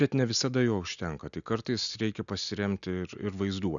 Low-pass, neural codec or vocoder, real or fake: 7.2 kHz; none; real